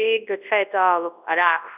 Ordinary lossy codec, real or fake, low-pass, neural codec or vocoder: none; fake; 3.6 kHz; codec, 24 kHz, 0.9 kbps, WavTokenizer, large speech release